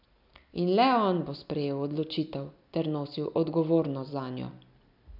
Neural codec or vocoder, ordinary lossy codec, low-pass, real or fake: none; none; 5.4 kHz; real